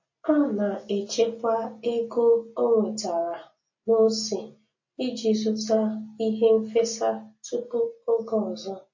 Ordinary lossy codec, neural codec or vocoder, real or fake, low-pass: MP3, 32 kbps; none; real; 7.2 kHz